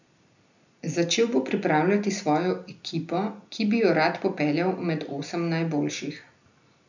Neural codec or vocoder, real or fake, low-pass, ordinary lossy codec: none; real; 7.2 kHz; none